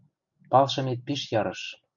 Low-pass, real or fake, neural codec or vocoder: 7.2 kHz; real; none